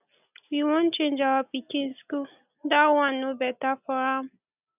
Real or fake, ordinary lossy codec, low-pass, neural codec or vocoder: real; none; 3.6 kHz; none